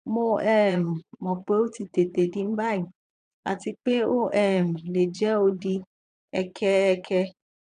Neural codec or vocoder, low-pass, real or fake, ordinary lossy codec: vocoder, 22.05 kHz, 80 mel bands, Vocos; 9.9 kHz; fake; none